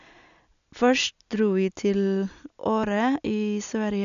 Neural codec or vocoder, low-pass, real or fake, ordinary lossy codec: none; 7.2 kHz; real; none